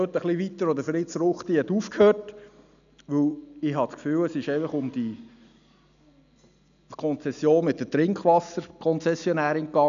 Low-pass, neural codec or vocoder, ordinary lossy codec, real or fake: 7.2 kHz; none; none; real